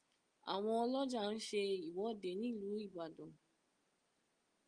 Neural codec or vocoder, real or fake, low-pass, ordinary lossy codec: none; real; 9.9 kHz; Opus, 32 kbps